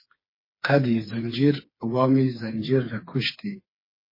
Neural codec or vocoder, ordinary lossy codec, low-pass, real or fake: codec, 16 kHz, 4 kbps, FreqCodec, smaller model; MP3, 24 kbps; 5.4 kHz; fake